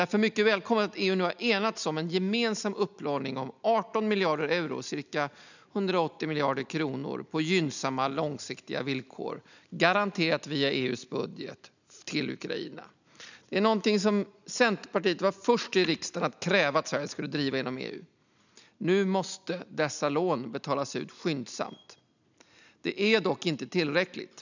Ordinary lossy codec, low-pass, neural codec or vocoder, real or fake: none; 7.2 kHz; none; real